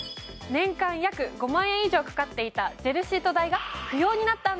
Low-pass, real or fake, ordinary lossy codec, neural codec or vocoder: none; real; none; none